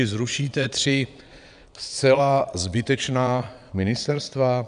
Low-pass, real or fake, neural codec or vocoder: 9.9 kHz; fake; vocoder, 22.05 kHz, 80 mel bands, Vocos